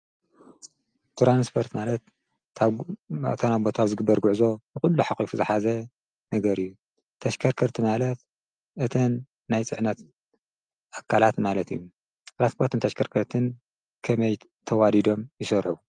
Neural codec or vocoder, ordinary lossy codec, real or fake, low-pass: none; Opus, 32 kbps; real; 9.9 kHz